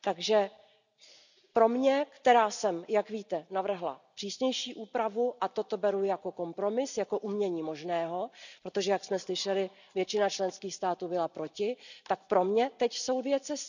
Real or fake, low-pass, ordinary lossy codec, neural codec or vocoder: real; 7.2 kHz; none; none